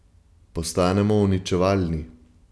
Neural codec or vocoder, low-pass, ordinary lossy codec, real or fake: none; none; none; real